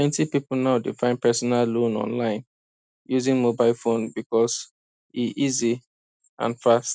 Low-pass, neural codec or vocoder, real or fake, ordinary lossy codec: none; none; real; none